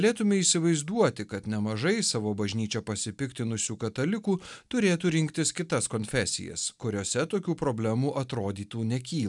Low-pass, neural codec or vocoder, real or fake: 10.8 kHz; none; real